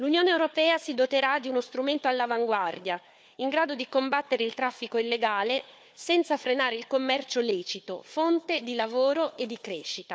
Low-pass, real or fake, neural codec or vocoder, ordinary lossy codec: none; fake; codec, 16 kHz, 4 kbps, FunCodec, trained on Chinese and English, 50 frames a second; none